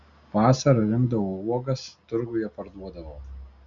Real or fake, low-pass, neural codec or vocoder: real; 7.2 kHz; none